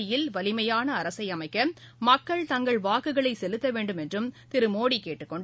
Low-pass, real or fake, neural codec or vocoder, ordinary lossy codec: none; real; none; none